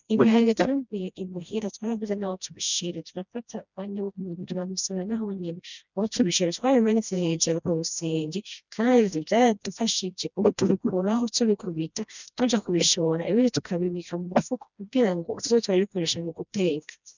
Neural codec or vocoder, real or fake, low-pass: codec, 16 kHz, 1 kbps, FreqCodec, smaller model; fake; 7.2 kHz